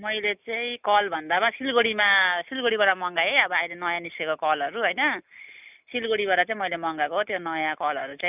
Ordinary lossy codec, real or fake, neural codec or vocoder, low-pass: none; real; none; 3.6 kHz